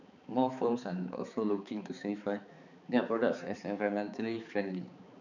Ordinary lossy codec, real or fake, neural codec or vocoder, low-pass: none; fake; codec, 16 kHz, 4 kbps, X-Codec, HuBERT features, trained on balanced general audio; 7.2 kHz